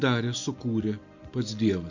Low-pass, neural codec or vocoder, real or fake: 7.2 kHz; none; real